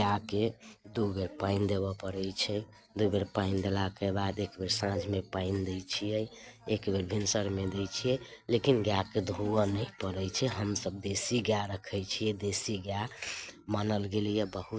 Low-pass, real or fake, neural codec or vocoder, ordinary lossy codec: none; real; none; none